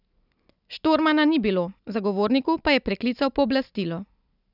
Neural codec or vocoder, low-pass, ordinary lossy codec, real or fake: none; 5.4 kHz; none; real